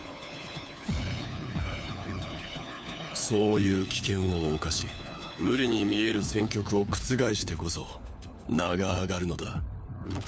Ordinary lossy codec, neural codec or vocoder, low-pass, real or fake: none; codec, 16 kHz, 4 kbps, FunCodec, trained on LibriTTS, 50 frames a second; none; fake